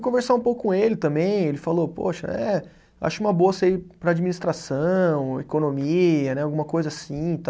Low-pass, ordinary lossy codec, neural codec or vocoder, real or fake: none; none; none; real